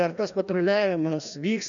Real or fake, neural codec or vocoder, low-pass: fake; codec, 16 kHz, 1 kbps, FreqCodec, larger model; 7.2 kHz